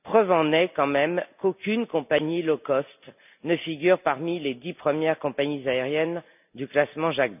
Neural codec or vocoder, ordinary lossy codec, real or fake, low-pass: none; none; real; 3.6 kHz